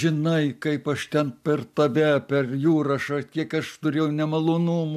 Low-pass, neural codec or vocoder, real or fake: 14.4 kHz; none; real